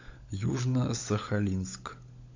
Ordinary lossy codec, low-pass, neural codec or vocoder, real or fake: AAC, 48 kbps; 7.2 kHz; codec, 16 kHz, 16 kbps, FunCodec, trained on LibriTTS, 50 frames a second; fake